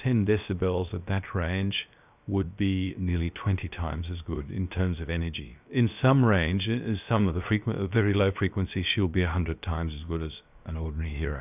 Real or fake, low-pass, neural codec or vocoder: fake; 3.6 kHz; codec, 16 kHz, about 1 kbps, DyCAST, with the encoder's durations